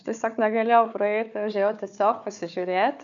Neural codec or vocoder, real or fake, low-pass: codec, 16 kHz, 4 kbps, FunCodec, trained on Chinese and English, 50 frames a second; fake; 7.2 kHz